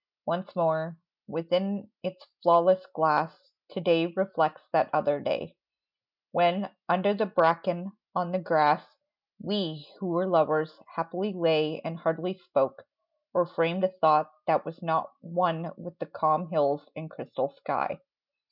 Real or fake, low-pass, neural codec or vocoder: real; 5.4 kHz; none